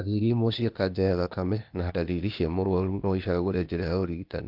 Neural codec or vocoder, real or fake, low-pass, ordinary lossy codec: codec, 16 kHz, 0.8 kbps, ZipCodec; fake; 5.4 kHz; Opus, 32 kbps